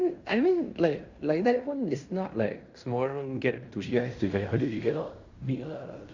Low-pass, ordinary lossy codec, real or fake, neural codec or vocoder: 7.2 kHz; Opus, 64 kbps; fake; codec, 16 kHz in and 24 kHz out, 0.9 kbps, LongCat-Audio-Codec, fine tuned four codebook decoder